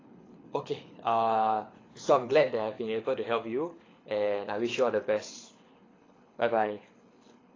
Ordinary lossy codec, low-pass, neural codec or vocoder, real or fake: AAC, 32 kbps; 7.2 kHz; codec, 24 kHz, 6 kbps, HILCodec; fake